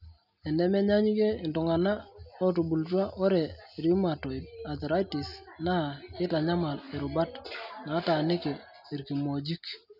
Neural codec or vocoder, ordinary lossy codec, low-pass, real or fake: none; none; 5.4 kHz; real